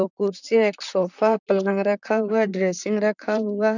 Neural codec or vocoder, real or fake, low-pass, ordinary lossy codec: vocoder, 44.1 kHz, 128 mel bands, Pupu-Vocoder; fake; 7.2 kHz; none